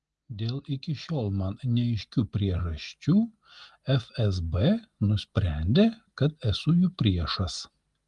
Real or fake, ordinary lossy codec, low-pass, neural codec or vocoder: real; Opus, 24 kbps; 10.8 kHz; none